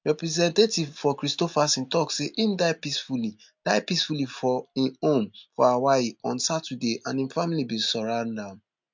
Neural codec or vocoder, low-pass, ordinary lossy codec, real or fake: none; 7.2 kHz; MP3, 64 kbps; real